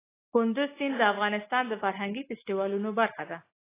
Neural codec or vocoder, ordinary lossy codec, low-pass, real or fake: none; AAC, 16 kbps; 3.6 kHz; real